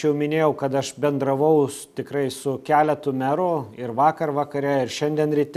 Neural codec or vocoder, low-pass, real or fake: none; 14.4 kHz; real